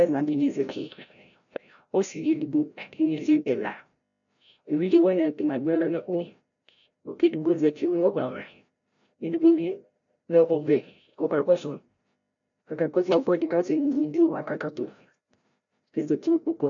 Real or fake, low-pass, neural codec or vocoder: fake; 7.2 kHz; codec, 16 kHz, 0.5 kbps, FreqCodec, larger model